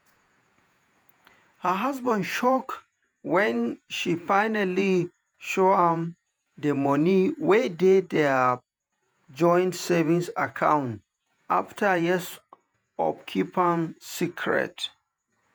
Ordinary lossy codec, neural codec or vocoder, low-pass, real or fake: none; vocoder, 48 kHz, 128 mel bands, Vocos; none; fake